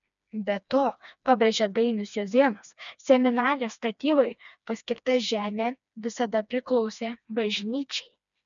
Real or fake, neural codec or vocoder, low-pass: fake; codec, 16 kHz, 2 kbps, FreqCodec, smaller model; 7.2 kHz